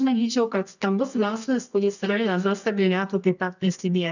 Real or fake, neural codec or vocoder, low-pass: fake; codec, 24 kHz, 0.9 kbps, WavTokenizer, medium music audio release; 7.2 kHz